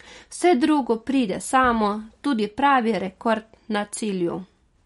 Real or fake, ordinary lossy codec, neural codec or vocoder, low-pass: real; MP3, 48 kbps; none; 19.8 kHz